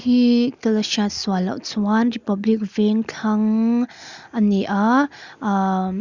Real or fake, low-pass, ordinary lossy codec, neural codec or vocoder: real; 7.2 kHz; Opus, 64 kbps; none